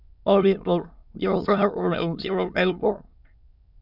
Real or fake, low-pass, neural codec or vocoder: fake; 5.4 kHz; autoencoder, 22.05 kHz, a latent of 192 numbers a frame, VITS, trained on many speakers